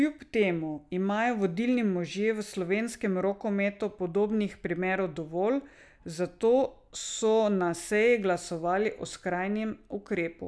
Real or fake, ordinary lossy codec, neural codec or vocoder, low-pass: real; none; none; none